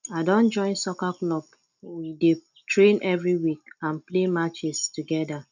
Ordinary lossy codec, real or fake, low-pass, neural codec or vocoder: none; real; 7.2 kHz; none